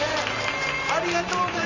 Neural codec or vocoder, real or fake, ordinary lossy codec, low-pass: vocoder, 44.1 kHz, 128 mel bands every 512 samples, BigVGAN v2; fake; none; 7.2 kHz